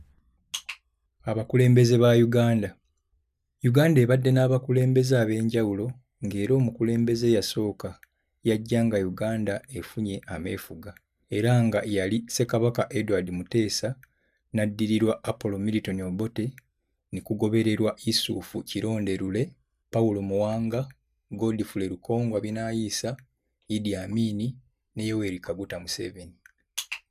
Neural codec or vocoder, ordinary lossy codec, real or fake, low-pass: none; none; real; 14.4 kHz